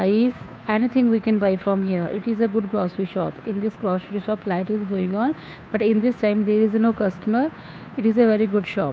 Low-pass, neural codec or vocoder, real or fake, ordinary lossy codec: none; codec, 16 kHz, 2 kbps, FunCodec, trained on Chinese and English, 25 frames a second; fake; none